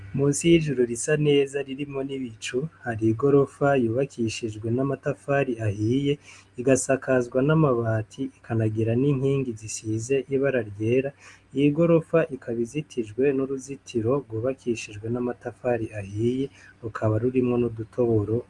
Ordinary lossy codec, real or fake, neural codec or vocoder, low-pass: Opus, 32 kbps; real; none; 10.8 kHz